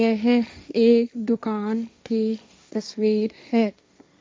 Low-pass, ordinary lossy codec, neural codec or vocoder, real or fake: none; none; codec, 16 kHz, 1.1 kbps, Voila-Tokenizer; fake